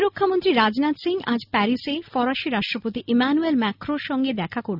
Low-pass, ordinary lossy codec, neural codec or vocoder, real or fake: 5.4 kHz; none; none; real